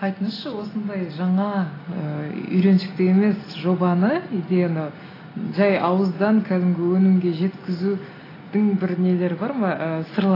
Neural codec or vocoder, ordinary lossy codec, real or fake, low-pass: none; AAC, 24 kbps; real; 5.4 kHz